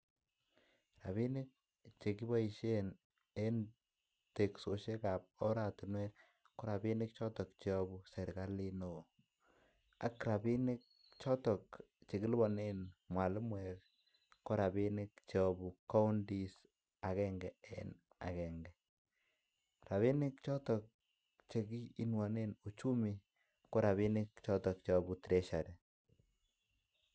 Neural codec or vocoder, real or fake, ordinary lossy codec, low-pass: none; real; none; none